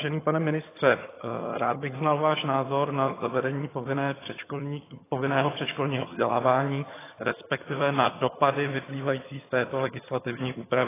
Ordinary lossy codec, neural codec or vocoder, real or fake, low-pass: AAC, 16 kbps; vocoder, 22.05 kHz, 80 mel bands, HiFi-GAN; fake; 3.6 kHz